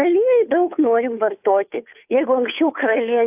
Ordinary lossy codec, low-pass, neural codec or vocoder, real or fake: AAC, 32 kbps; 3.6 kHz; codec, 16 kHz, 2 kbps, FunCodec, trained on Chinese and English, 25 frames a second; fake